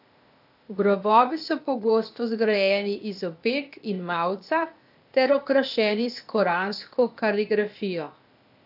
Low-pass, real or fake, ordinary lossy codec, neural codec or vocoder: 5.4 kHz; fake; AAC, 48 kbps; codec, 16 kHz, 0.8 kbps, ZipCodec